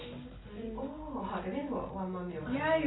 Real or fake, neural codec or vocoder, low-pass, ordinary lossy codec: real; none; 7.2 kHz; AAC, 16 kbps